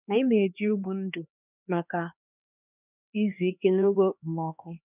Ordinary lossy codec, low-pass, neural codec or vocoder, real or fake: none; 3.6 kHz; codec, 16 kHz, 2 kbps, X-Codec, HuBERT features, trained on LibriSpeech; fake